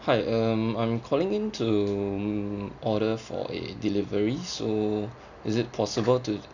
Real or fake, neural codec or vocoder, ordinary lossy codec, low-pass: real; none; none; 7.2 kHz